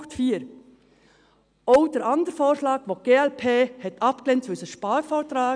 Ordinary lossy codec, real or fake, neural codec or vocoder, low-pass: none; real; none; 9.9 kHz